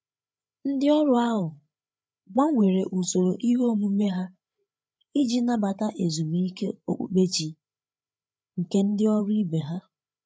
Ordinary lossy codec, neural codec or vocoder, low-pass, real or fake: none; codec, 16 kHz, 8 kbps, FreqCodec, larger model; none; fake